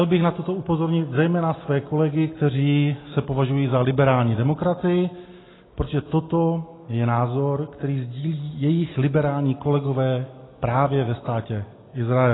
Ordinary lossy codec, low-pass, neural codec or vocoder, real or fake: AAC, 16 kbps; 7.2 kHz; none; real